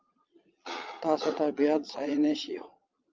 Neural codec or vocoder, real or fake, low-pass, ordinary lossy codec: vocoder, 22.05 kHz, 80 mel bands, Vocos; fake; 7.2 kHz; Opus, 24 kbps